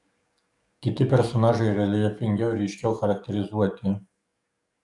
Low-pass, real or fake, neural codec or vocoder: 10.8 kHz; fake; codec, 44.1 kHz, 7.8 kbps, DAC